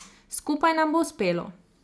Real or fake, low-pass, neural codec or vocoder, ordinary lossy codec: real; none; none; none